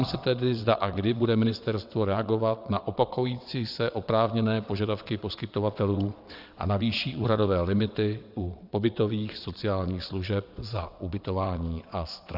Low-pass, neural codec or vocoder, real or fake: 5.4 kHz; vocoder, 22.05 kHz, 80 mel bands, WaveNeXt; fake